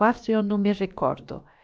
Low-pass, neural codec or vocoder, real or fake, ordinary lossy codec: none; codec, 16 kHz, about 1 kbps, DyCAST, with the encoder's durations; fake; none